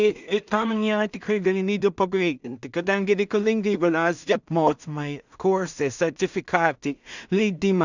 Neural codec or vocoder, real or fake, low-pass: codec, 16 kHz in and 24 kHz out, 0.4 kbps, LongCat-Audio-Codec, two codebook decoder; fake; 7.2 kHz